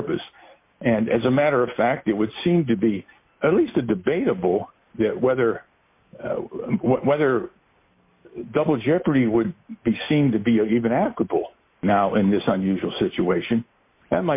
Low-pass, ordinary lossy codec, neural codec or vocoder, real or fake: 3.6 kHz; MP3, 24 kbps; none; real